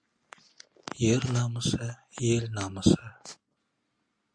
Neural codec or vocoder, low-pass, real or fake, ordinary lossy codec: none; 9.9 kHz; real; Opus, 64 kbps